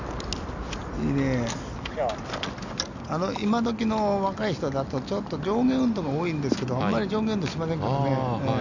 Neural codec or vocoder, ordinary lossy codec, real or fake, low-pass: none; none; real; 7.2 kHz